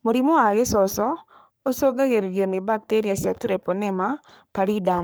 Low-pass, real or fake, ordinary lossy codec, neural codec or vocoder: none; fake; none; codec, 44.1 kHz, 3.4 kbps, Pupu-Codec